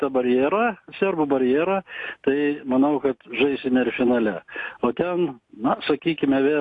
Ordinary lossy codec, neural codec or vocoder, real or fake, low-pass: AAC, 48 kbps; none; real; 10.8 kHz